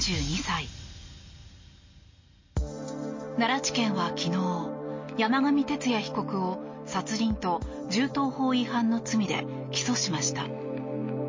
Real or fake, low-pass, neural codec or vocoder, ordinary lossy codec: real; 7.2 kHz; none; MP3, 32 kbps